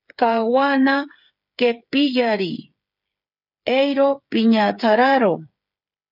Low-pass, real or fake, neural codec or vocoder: 5.4 kHz; fake; codec, 16 kHz, 8 kbps, FreqCodec, smaller model